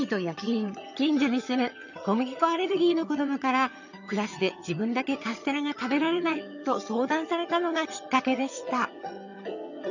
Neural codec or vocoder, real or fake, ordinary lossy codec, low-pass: vocoder, 22.05 kHz, 80 mel bands, HiFi-GAN; fake; none; 7.2 kHz